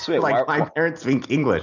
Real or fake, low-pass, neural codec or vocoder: real; 7.2 kHz; none